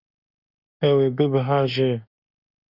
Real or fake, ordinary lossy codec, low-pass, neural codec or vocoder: fake; Opus, 64 kbps; 5.4 kHz; autoencoder, 48 kHz, 32 numbers a frame, DAC-VAE, trained on Japanese speech